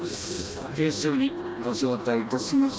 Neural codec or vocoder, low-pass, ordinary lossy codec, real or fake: codec, 16 kHz, 1 kbps, FreqCodec, smaller model; none; none; fake